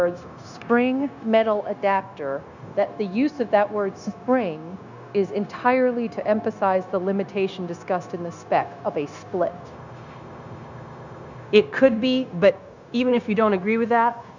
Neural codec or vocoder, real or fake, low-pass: codec, 16 kHz, 0.9 kbps, LongCat-Audio-Codec; fake; 7.2 kHz